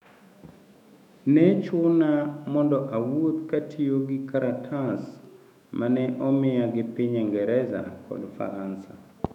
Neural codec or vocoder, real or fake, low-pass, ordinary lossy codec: autoencoder, 48 kHz, 128 numbers a frame, DAC-VAE, trained on Japanese speech; fake; 19.8 kHz; none